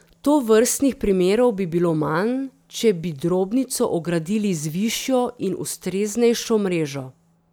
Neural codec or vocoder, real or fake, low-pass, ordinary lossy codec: none; real; none; none